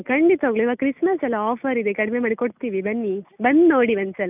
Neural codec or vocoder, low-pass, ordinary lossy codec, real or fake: none; 3.6 kHz; none; real